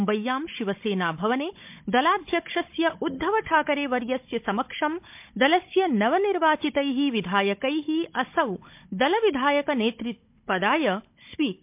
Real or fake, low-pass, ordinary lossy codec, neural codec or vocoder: fake; 3.6 kHz; MP3, 32 kbps; codec, 16 kHz, 16 kbps, FunCodec, trained on Chinese and English, 50 frames a second